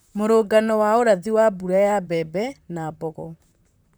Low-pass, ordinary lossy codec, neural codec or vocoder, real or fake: none; none; vocoder, 44.1 kHz, 128 mel bands, Pupu-Vocoder; fake